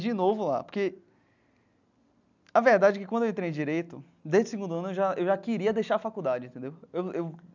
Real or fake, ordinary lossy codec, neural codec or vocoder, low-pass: real; none; none; 7.2 kHz